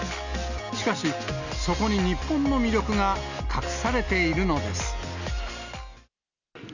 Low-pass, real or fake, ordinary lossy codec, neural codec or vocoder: 7.2 kHz; real; none; none